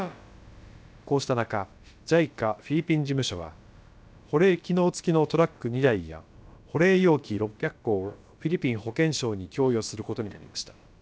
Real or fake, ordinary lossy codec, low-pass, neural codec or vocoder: fake; none; none; codec, 16 kHz, about 1 kbps, DyCAST, with the encoder's durations